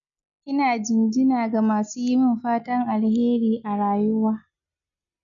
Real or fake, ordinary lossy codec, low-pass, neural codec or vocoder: real; MP3, 96 kbps; 7.2 kHz; none